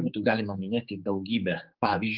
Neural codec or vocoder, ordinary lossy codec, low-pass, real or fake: codec, 16 kHz, 4 kbps, X-Codec, HuBERT features, trained on general audio; Opus, 32 kbps; 5.4 kHz; fake